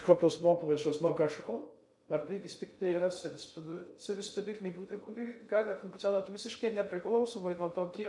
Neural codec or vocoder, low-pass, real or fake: codec, 16 kHz in and 24 kHz out, 0.6 kbps, FocalCodec, streaming, 2048 codes; 10.8 kHz; fake